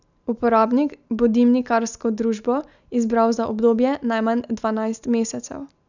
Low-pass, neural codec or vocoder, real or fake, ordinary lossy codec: 7.2 kHz; none; real; none